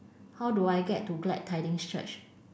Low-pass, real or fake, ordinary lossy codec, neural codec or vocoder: none; real; none; none